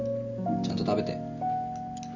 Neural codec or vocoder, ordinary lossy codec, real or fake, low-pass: none; none; real; 7.2 kHz